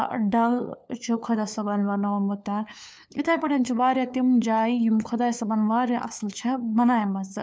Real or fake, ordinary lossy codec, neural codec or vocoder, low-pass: fake; none; codec, 16 kHz, 4 kbps, FunCodec, trained on LibriTTS, 50 frames a second; none